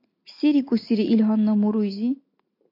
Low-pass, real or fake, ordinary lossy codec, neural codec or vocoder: 5.4 kHz; real; AAC, 32 kbps; none